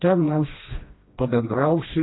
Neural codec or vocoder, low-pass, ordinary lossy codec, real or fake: codec, 16 kHz, 1 kbps, FreqCodec, smaller model; 7.2 kHz; AAC, 16 kbps; fake